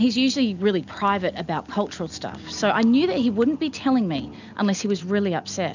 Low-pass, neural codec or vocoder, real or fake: 7.2 kHz; none; real